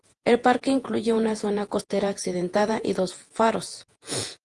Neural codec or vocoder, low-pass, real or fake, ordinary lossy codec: vocoder, 48 kHz, 128 mel bands, Vocos; 10.8 kHz; fake; Opus, 24 kbps